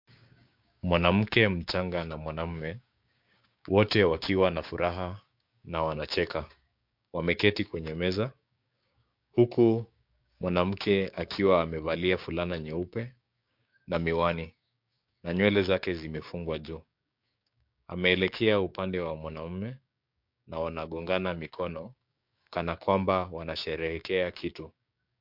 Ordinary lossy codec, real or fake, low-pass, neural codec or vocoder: MP3, 48 kbps; fake; 5.4 kHz; codec, 16 kHz, 6 kbps, DAC